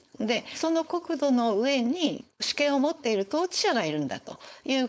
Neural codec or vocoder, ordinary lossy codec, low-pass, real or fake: codec, 16 kHz, 4.8 kbps, FACodec; none; none; fake